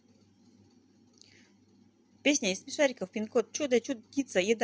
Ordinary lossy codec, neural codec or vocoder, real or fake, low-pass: none; none; real; none